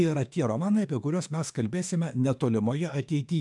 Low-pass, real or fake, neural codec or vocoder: 10.8 kHz; fake; autoencoder, 48 kHz, 32 numbers a frame, DAC-VAE, trained on Japanese speech